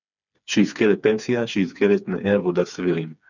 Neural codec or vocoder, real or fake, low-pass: codec, 16 kHz, 4 kbps, FreqCodec, smaller model; fake; 7.2 kHz